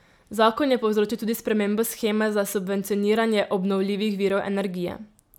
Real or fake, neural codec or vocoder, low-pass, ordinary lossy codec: real; none; 19.8 kHz; none